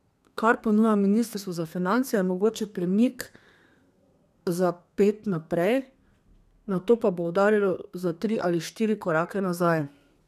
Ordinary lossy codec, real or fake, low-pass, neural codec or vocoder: none; fake; 14.4 kHz; codec, 32 kHz, 1.9 kbps, SNAC